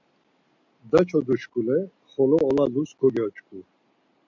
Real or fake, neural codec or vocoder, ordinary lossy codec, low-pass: real; none; MP3, 64 kbps; 7.2 kHz